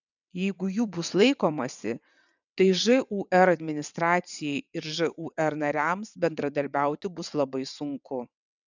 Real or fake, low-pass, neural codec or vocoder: fake; 7.2 kHz; vocoder, 22.05 kHz, 80 mel bands, WaveNeXt